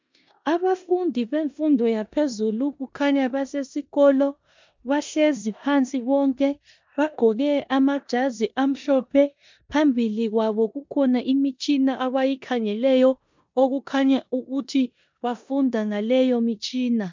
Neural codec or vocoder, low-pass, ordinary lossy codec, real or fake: codec, 16 kHz in and 24 kHz out, 0.9 kbps, LongCat-Audio-Codec, four codebook decoder; 7.2 kHz; MP3, 64 kbps; fake